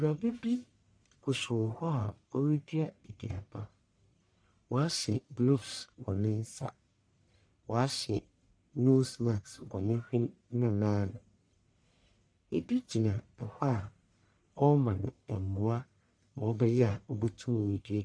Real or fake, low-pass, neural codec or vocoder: fake; 9.9 kHz; codec, 44.1 kHz, 1.7 kbps, Pupu-Codec